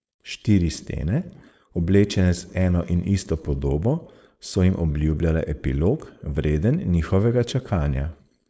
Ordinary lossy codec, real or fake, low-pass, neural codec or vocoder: none; fake; none; codec, 16 kHz, 4.8 kbps, FACodec